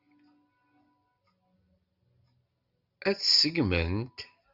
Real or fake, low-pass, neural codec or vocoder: real; 5.4 kHz; none